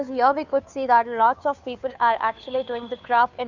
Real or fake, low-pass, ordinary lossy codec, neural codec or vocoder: fake; 7.2 kHz; none; codec, 16 kHz, 2 kbps, FunCodec, trained on Chinese and English, 25 frames a second